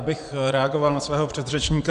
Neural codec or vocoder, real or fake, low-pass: vocoder, 24 kHz, 100 mel bands, Vocos; fake; 10.8 kHz